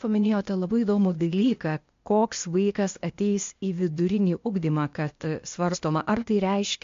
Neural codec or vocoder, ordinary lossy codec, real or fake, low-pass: codec, 16 kHz, 0.8 kbps, ZipCodec; MP3, 48 kbps; fake; 7.2 kHz